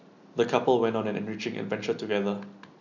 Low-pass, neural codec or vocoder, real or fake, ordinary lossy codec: 7.2 kHz; none; real; none